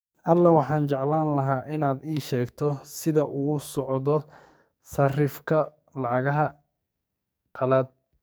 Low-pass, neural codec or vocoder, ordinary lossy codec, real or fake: none; codec, 44.1 kHz, 2.6 kbps, SNAC; none; fake